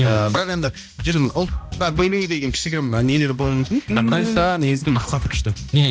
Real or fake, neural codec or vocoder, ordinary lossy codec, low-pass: fake; codec, 16 kHz, 1 kbps, X-Codec, HuBERT features, trained on balanced general audio; none; none